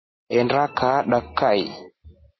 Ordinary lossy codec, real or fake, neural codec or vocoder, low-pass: MP3, 24 kbps; real; none; 7.2 kHz